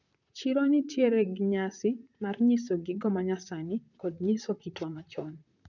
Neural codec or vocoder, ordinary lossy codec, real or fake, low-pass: vocoder, 44.1 kHz, 128 mel bands, Pupu-Vocoder; none; fake; 7.2 kHz